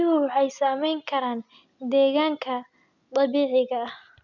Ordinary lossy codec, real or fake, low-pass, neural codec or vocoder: none; real; 7.2 kHz; none